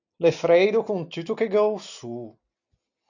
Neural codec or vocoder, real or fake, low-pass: none; real; 7.2 kHz